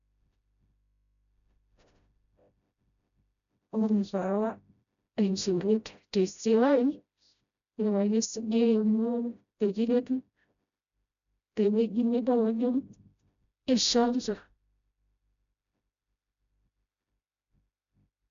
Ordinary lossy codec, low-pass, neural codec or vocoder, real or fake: none; 7.2 kHz; codec, 16 kHz, 0.5 kbps, FreqCodec, smaller model; fake